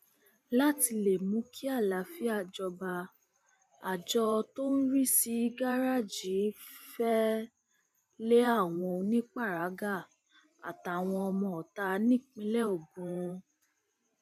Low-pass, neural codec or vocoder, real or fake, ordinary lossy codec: 14.4 kHz; vocoder, 44.1 kHz, 128 mel bands every 512 samples, BigVGAN v2; fake; none